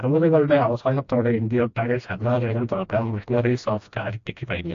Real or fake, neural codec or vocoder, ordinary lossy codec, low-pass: fake; codec, 16 kHz, 1 kbps, FreqCodec, smaller model; AAC, 48 kbps; 7.2 kHz